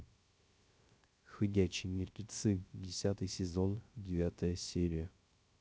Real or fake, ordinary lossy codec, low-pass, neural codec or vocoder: fake; none; none; codec, 16 kHz, 0.3 kbps, FocalCodec